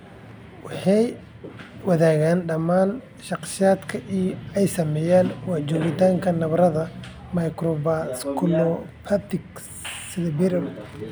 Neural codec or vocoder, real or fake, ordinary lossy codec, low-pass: none; real; none; none